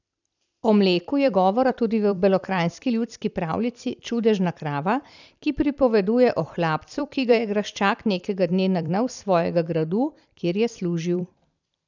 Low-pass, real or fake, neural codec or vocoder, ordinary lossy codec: 7.2 kHz; fake; vocoder, 44.1 kHz, 128 mel bands every 256 samples, BigVGAN v2; none